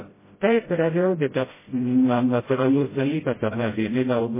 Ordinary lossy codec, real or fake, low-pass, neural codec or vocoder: MP3, 16 kbps; fake; 3.6 kHz; codec, 16 kHz, 0.5 kbps, FreqCodec, smaller model